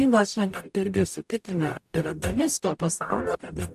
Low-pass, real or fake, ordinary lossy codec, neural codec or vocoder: 14.4 kHz; fake; AAC, 96 kbps; codec, 44.1 kHz, 0.9 kbps, DAC